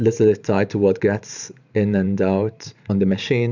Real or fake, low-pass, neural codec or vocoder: fake; 7.2 kHz; vocoder, 44.1 kHz, 128 mel bands every 512 samples, BigVGAN v2